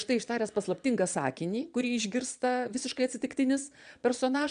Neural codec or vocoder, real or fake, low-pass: vocoder, 22.05 kHz, 80 mel bands, Vocos; fake; 9.9 kHz